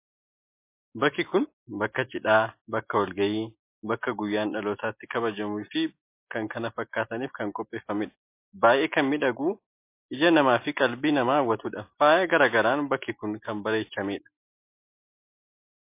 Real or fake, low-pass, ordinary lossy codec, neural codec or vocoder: real; 3.6 kHz; MP3, 24 kbps; none